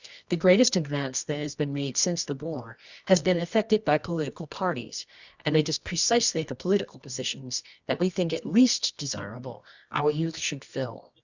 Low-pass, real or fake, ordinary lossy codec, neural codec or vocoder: 7.2 kHz; fake; Opus, 64 kbps; codec, 24 kHz, 0.9 kbps, WavTokenizer, medium music audio release